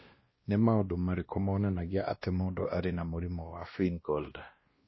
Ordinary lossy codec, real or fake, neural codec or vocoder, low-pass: MP3, 24 kbps; fake; codec, 16 kHz, 1 kbps, X-Codec, WavLM features, trained on Multilingual LibriSpeech; 7.2 kHz